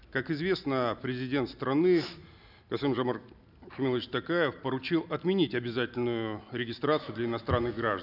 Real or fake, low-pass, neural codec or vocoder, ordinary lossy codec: real; 5.4 kHz; none; none